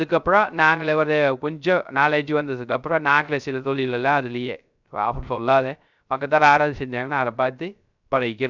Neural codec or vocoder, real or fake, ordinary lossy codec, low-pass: codec, 16 kHz, 0.3 kbps, FocalCodec; fake; none; 7.2 kHz